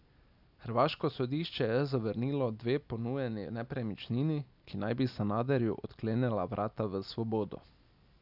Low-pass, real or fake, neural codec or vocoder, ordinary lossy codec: 5.4 kHz; real; none; none